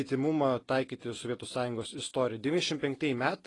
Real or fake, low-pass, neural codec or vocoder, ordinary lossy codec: real; 10.8 kHz; none; AAC, 32 kbps